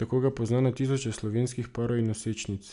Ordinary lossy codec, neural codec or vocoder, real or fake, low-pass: none; none; real; 10.8 kHz